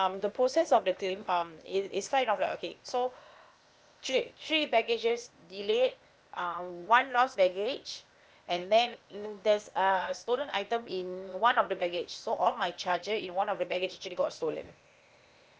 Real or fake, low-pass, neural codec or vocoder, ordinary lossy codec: fake; none; codec, 16 kHz, 0.8 kbps, ZipCodec; none